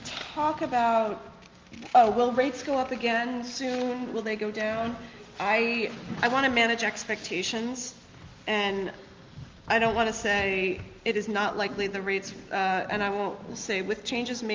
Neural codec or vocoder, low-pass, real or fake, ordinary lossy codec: none; 7.2 kHz; real; Opus, 32 kbps